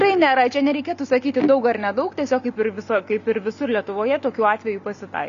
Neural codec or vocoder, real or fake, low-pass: none; real; 7.2 kHz